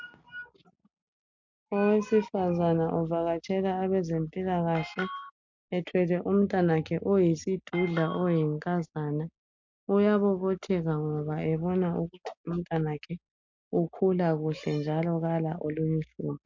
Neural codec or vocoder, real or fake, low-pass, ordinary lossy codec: none; real; 7.2 kHz; MP3, 48 kbps